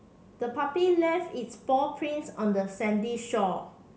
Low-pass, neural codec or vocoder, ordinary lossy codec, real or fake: none; none; none; real